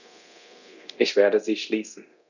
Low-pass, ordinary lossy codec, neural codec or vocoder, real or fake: 7.2 kHz; none; codec, 24 kHz, 0.9 kbps, DualCodec; fake